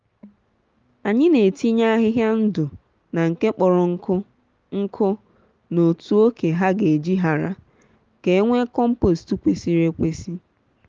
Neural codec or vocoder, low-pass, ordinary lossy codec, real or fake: none; 7.2 kHz; Opus, 32 kbps; real